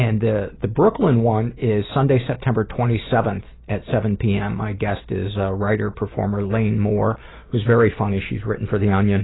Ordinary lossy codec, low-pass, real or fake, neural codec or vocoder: AAC, 16 kbps; 7.2 kHz; real; none